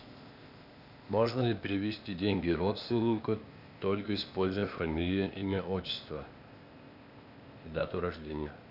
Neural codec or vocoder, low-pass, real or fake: codec, 16 kHz, 0.8 kbps, ZipCodec; 5.4 kHz; fake